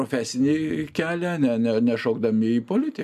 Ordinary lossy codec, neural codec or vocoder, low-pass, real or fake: MP3, 96 kbps; none; 14.4 kHz; real